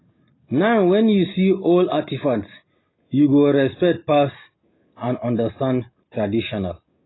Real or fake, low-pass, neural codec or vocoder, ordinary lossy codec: real; 7.2 kHz; none; AAC, 16 kbps